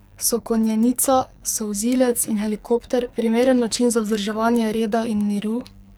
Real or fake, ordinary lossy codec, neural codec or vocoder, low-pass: fake; none; codec, 44.1 kHz, 2.6 kbps, SNAC; none